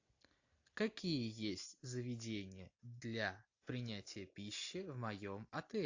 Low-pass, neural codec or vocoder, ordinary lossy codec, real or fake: 7.2 kHz; none; AAC, 48 kbps; real